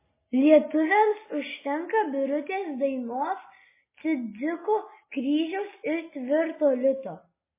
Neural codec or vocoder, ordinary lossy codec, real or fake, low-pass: none; MP3, 16 kbps; real; 3.6 kHz